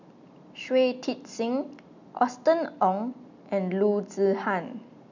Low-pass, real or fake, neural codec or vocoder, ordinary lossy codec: 7.2 kHz; real; none; none